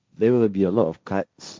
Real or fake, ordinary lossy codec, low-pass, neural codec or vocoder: fake; none; none; codec, 16 kHz, 1.1 kbps, Voila-Tokenizer